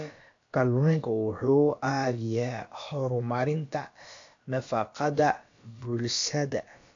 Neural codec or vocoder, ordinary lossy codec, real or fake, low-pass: codec, 16 kHz, about 1 kbps, DyCAST, with the encoder's durations; AAC, 48 kbps; fake; 7.2 kHz